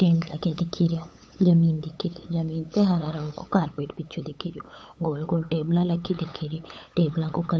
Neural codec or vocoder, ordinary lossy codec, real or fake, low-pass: codec, 16 kHz, 8 kbps, FunCodec, trained on LibriTTS, 25 frames a second; none; fake; none